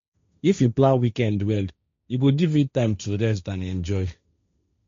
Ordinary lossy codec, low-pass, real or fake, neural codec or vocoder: MP3, 48 kbps; 7.2 kHz; fake; codec, 16 kHz, 1.1 kbps, Voila-Tokenizer